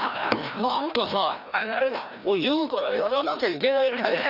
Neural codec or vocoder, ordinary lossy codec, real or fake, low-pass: codec, 16 kHz, 1 kbps, FreqCodec, larger model; none; fake; 5.4 kHz